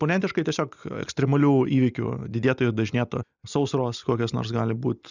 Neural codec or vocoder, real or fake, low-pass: none; real; 7.2 kHz